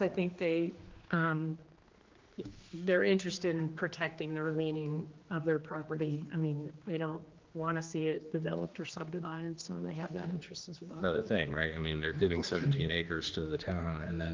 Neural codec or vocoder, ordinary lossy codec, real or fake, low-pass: codec, 16 kHz, 2 kbps, X-Codec, HuBERT features, trained on general audio; Opus, 24 kbps; fake; 7.2 kHz